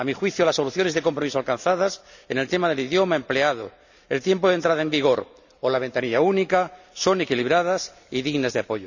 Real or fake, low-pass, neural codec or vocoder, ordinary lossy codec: real; 7.2 kHz; none; none